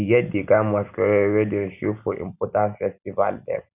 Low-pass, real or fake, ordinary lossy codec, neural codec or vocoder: 3.6 kHz; real; none; none